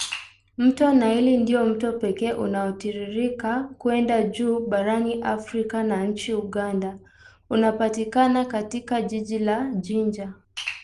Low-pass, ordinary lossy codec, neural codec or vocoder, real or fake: 10.8 kHz; Opus, 32 kbps; none; real